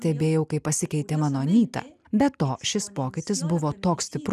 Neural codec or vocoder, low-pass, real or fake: none; 14.4 kHz; real